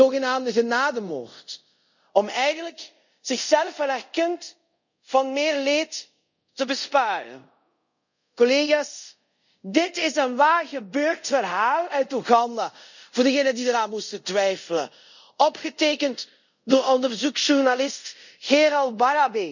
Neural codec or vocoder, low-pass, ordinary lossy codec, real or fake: codec, 24 kHz, 0.5 kbps, DualCodec; 7.2 kHz; none; fake